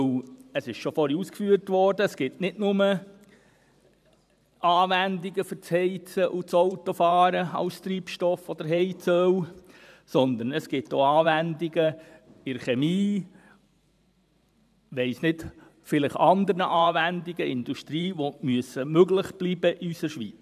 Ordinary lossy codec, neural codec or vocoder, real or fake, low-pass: none; vocoder, 44.1 kHz, 128 mel bands every 512 samples, BigVGAN v2; fake; 14.4 kHz